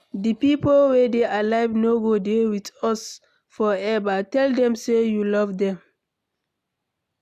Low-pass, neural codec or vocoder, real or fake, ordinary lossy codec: 14.4 kHz; none; real; none